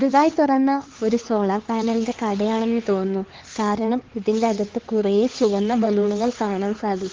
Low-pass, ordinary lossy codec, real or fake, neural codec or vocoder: 7.2 kHz; Opus, 16 kbps; fake; codec, 16 kHz, 4 kbps, X-Codec, HuBERT features, trained on LibriSpeech